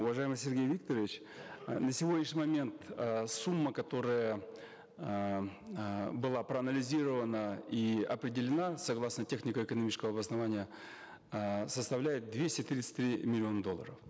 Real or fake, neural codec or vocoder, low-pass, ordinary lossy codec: real; none; none; none